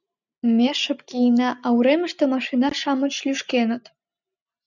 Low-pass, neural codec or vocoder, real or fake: 7.2 kHz; none; real